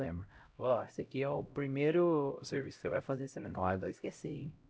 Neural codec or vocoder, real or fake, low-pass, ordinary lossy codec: codec, 16 kHz, 0.5 kbps, X-Codec, HuBERT features, trained on LibriSpeech; fake; none; none